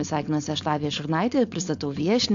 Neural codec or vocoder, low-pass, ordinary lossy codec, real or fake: codec, 16 kHz, 4.8 kbps, FACodec; 7.2 kHz; AAC, 64 kbps; fake